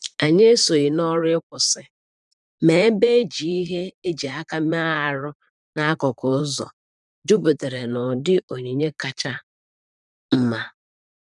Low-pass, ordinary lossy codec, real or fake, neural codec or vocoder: 10.8 kHz; none; fake; vocoder, 44.1 kHz, 128 mel bands, Pupu-Vocoder